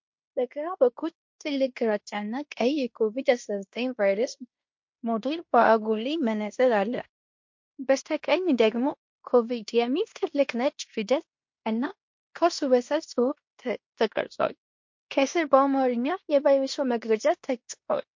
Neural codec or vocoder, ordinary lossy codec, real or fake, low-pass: codec, 16 kHz in and 24 kHz out, 0.9 kbps, LongCat-Audio-Codec, fine tuned four codebook decoder; MP3, 48 kbps; fake; 7.2 kHz